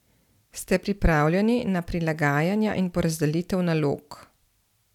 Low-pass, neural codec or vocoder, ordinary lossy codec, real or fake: 19.8 kHz; none; none; real